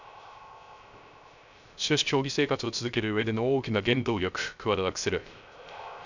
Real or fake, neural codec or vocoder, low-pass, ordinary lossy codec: fake; codec, 16 kHz, 0.3 kbps, FocalCodec; 7.2 kHz; none